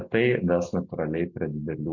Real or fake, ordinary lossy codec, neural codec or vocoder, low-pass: real; MP3, 48 kbps; none; 7.2 kHz